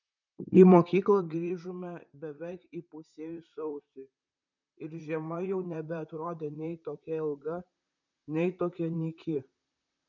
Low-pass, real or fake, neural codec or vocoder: 7.2 kHz; fake; vocoder, 44.1 kHz, 128 mel bands, Pupu-Vocoder